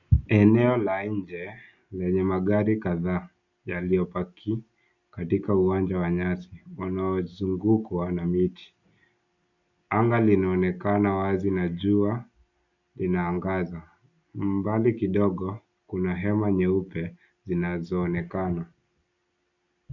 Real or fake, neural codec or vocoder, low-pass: real; none; 7.2 kHz